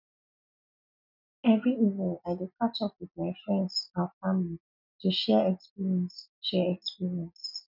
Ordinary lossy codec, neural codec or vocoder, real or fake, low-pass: none; none; real; 5.4 kHz